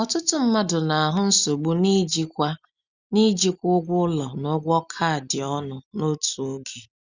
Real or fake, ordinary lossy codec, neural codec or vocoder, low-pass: real; Opus, 64 kbps; none; 7.2 kHz